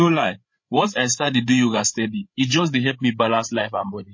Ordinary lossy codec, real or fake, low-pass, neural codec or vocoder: MP3, 32 kbps; fake; 7.2 kHz; codec, 16 kHz, 8 kbps, FreqCodec, larger model